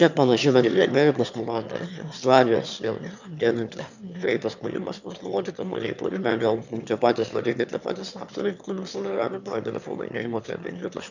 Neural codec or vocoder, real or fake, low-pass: autoencoder, 22.05 kHz, a latent of 192 numbers a frame, VITS, trained on one speaker; fake; 7.2 kHz